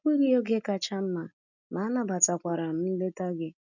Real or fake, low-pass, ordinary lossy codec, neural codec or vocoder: real; 7.2 kHz; none; none